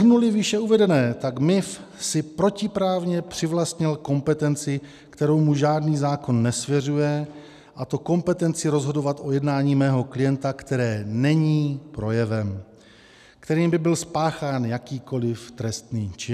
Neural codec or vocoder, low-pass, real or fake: none; 14.4 kHz; real